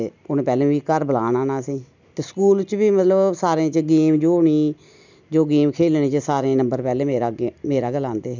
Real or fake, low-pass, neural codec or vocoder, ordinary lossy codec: real; 7.2 kHz; none; none